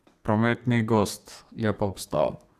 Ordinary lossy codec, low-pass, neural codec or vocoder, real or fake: Opus, 64 kbps; 14.4 kHz; codec, 32 kHz, 1.9 kbps, SNAC; fake